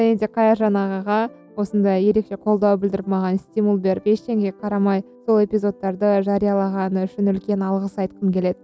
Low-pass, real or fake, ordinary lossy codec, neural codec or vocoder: none; real; none; none